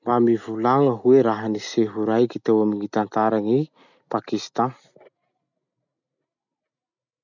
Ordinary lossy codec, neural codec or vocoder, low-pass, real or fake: none; none; 7.2 kHz; real